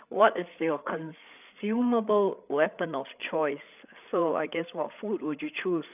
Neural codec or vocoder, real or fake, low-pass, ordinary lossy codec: codec, 16 kHz, 8 kbps, FreqCodec, larger model; fake; 3.6 kHz; none